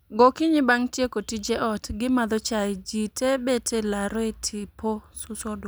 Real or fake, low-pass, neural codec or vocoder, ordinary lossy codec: real; none; none; none